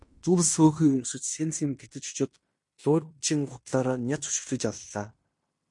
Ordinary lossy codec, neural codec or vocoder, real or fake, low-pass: MP3, 48 kbps; codec, 16 kHz in and 24 kHz out, 0.9 kbps, LongCat-Audio-Codec, fine tuned four codebook decoder; fake; 10.8 kHz